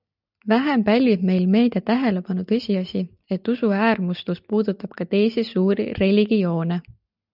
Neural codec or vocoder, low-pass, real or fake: none; 5.4 kHz; real